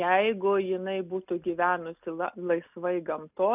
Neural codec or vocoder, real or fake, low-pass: none; real; 3.6 kHz